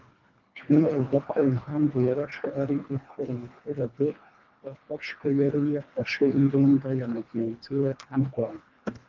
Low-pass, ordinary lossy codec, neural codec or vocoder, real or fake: 7.2 kHz; Opus, 24 kbps; codec, 24 kHz, 1.5 kbps, HILCodec; fake